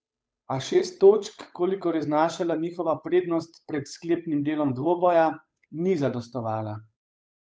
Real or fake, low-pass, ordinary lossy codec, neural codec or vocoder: fake; none; none; codec, 16 kHz, 8 kbps, FunCodec, trained on Chinese and English, 25 frames a second